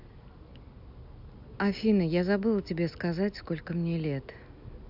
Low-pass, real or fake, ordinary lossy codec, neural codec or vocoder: 5.4 kHz; real; AAC, 48 kbps; none